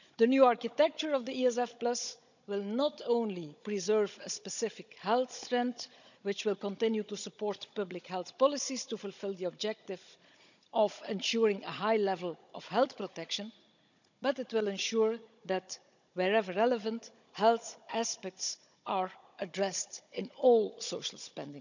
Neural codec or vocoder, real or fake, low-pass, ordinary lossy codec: codec, 16 kHz, 16 kbps, FunCodec, trained on Chinese and English, 50 frames a second; fake; 7.2 kHz; none